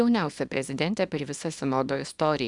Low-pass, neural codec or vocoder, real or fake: 10.8 kHz; codec, 24 kHz, 0.9 kbps, WavTokenizer, small release; fake